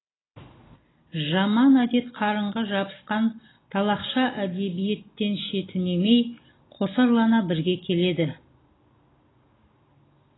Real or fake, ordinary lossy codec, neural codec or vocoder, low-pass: real; AAC, 16 kbps; none; 7.2 kHz